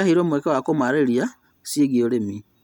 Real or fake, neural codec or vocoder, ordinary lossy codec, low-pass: real; none; none; 19.8 kHz